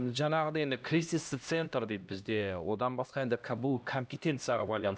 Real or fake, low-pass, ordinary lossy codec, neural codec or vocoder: fake; none; none; codec, 16 kHz, 1 kbps, X-Codec, HuBERT features, trained on LibriSpeech